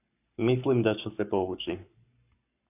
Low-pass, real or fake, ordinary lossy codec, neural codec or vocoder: 3.6 kHz; fake; AAC, 32 kbps; codec, 44.1 kHz, 7.8 kbps, Pupu-Codec